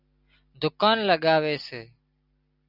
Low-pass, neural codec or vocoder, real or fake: 5.4 kHz; none; real